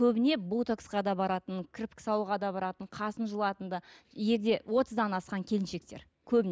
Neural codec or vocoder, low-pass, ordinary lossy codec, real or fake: none; none; none; real